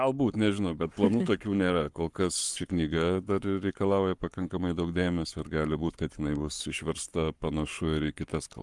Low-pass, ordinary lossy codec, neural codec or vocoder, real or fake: 10.8 kHz; Opus, 32 kbps; codec, 44.1 kHz, 7.8 kbps, Pupu-Codec; fake